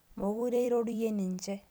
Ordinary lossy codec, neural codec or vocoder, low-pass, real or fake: none; vocoder, 44.1 kHz, 128 mel bands every 256 samples, BigVGAN v2; none; fake